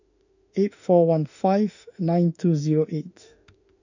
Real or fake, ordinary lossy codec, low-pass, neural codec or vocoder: fake; none; 7.2 kHz; autoencoder, 48 kHz, 32 numbers a frame, DAC-VAE, trained on Japanese speech